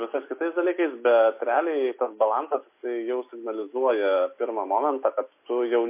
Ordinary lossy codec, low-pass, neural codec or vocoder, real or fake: MP3, 24 kbps; 3.6 kHz; none; real